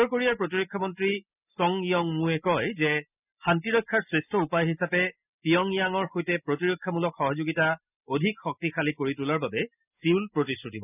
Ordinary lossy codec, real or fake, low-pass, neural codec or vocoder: none; real; 3.6 kHz; none